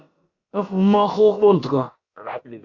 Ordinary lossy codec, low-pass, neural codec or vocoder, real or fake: Opus, 64 kbps; 7.2 kHz; codec, 16 kHz, about 1 kbps, DyCAST, with the encoder's durations; fake